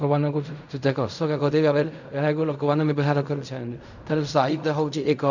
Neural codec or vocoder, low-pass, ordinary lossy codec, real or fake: codec, 16 kHz in and 24 kHz out, 0.4 kbps, LongCat-Audio-Codec, fine tuned four codebook decoder; 7.2 kHz; none; fake